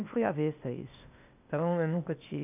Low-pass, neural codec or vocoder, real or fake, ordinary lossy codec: 3.6 kHz; codec, 16 kHz, 0.8 kbps, ZipCodec; fake; none